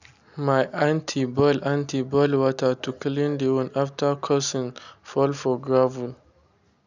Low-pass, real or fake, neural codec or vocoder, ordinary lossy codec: 7.2 kHz; real; none; none